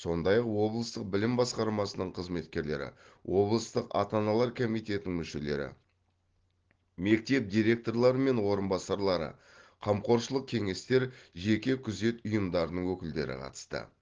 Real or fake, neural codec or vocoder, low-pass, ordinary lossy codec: real; none; 7.2 kHz; Opus, 16 kbps